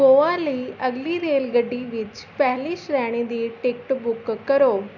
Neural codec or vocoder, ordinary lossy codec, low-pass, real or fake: none; none; 7.2 kHz; real